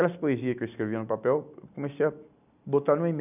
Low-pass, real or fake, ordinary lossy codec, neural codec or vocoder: 3.6 kHz; real; none; none